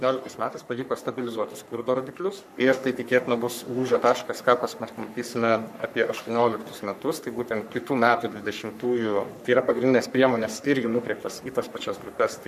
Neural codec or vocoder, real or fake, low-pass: codec, 44.1 kHz, 3.4 kbps, Pupu-Codec; fake; 14.4 kHz